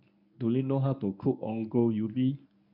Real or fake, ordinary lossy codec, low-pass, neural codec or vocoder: fake; none; 5.4 kHz; codec, 24 kHz, 0.9 kbps, WavTokenizer, medium speech release version 1